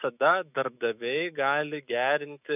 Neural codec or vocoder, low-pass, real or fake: autoencoder, 48 kHz, 128 numbers a frame, DAC-VAE, trained on Japanese speech; 3.6 kHz; fake